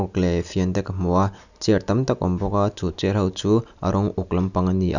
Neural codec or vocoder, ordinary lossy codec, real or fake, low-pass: none; none; real; 7.2 kHz